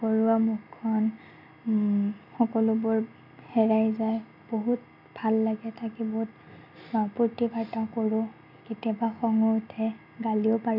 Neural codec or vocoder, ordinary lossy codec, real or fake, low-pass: none; none; real; 5.4 kHz